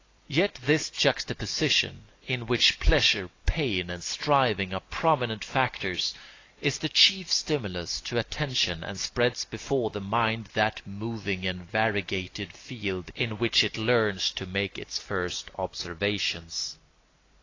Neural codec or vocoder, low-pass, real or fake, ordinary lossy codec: none; 7.2 kHz; real; AAC, 32 kbps